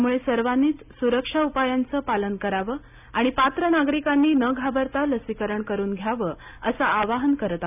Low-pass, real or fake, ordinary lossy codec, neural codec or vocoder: 3.6 kHz; real; none; none